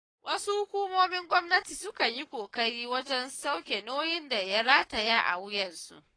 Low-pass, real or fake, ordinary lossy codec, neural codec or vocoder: 9.9 kHz; fake; AAC, 32 kbps; codec, 44.1 kHz, 7.8 kbps, Pupu-Codec